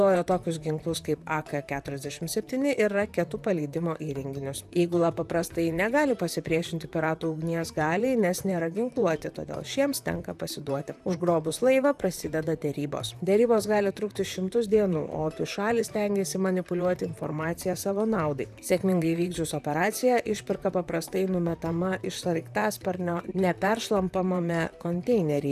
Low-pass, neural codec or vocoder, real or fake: 14.4 kHz; vocoder, 44.1 kHz, 128 mel bands, Pupu-Vocoder; fake